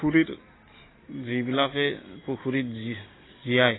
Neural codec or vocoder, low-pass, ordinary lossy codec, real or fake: none; 7.2 kHz; AAC, 16 kbps; real